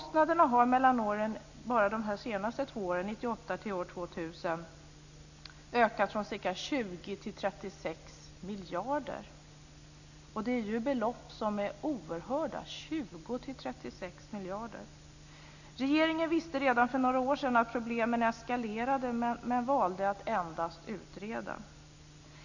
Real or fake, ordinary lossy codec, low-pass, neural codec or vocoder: real; none; 7.2 kHz; none